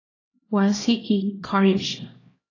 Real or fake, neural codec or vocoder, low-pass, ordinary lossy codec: fake; codec, 16 kHz, 1 kbps, X-Codec, HuBERT features, trained on LibriSpeech; 7.2 kHz; AAC, 32 kbps